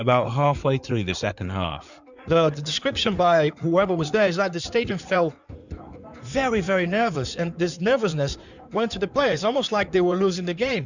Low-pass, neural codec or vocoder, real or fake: 7.2 kHz; codec, 16 kHz in and 24 kHz out, 2.2 kbps, FireRedTTS-2 codec; fake